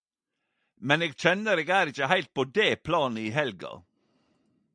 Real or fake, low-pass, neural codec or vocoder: real; 9.9 kHz; none